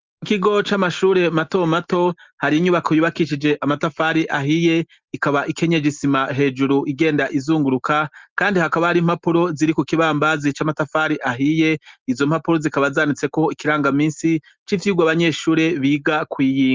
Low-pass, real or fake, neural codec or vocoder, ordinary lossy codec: 7.2 kHz; real; none; Opus, 32 kbps